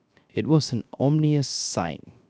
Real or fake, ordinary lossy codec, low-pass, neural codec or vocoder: fake; none; none; codec, 16 kHz, 0.7 kbps, FocalCodec